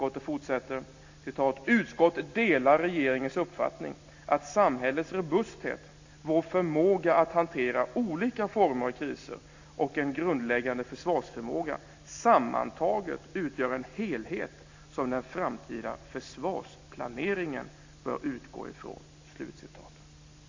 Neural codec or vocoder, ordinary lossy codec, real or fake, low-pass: none; AAC, 48 kbps; real; 7.2 kHz